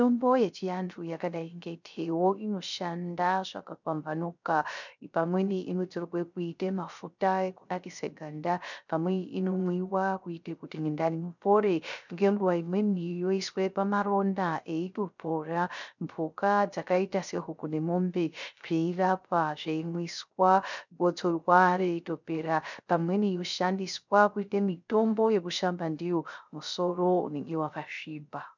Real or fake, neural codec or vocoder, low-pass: fake; codec, 16 kHz, 0.3 kbps, FocalCodec; 7.2 kHz